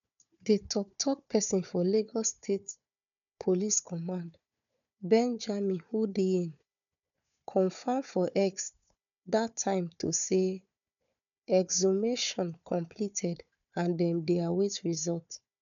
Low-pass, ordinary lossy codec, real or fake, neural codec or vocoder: 7.2 kHz; none; fake; codec, 16 kHz, 4 kbps, FunCodec, trained on Chinese and English, 50 frames a second